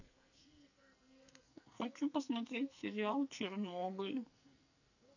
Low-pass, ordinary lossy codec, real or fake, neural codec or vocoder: 7.2 kHz; none; fake; codec, 44.1 kHz, 2.6 kbps, SNAC